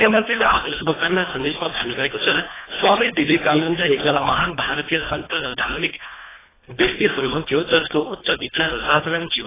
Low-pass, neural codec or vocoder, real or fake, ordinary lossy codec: 3.6 kHz; codec, 24 kHz, 1.5 kbps, HILCodec; fake; AAC, 16 kbps